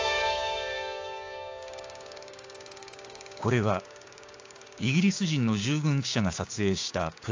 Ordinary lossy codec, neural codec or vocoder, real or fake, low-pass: AAC, 48 kbps; codec, 16 kHz, 6 kbps, DAC; fake; 7.2 kHz